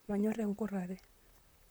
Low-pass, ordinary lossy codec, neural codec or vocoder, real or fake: none; none; vocoder, 44.1 kHz, 128 mel bands, Pupu-Vocoder; fake